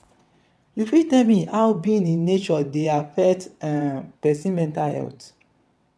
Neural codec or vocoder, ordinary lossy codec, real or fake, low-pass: vocoder, 22.05 kHz, 80 mel bands, WaveNeXt; none; fake; none